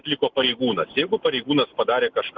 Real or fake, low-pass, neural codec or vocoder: real; 7.2 kHz; none